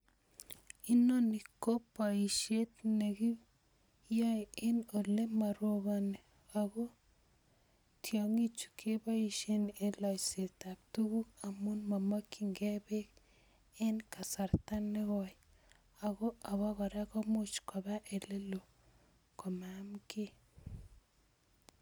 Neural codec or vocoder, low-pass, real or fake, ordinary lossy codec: none; none; real; none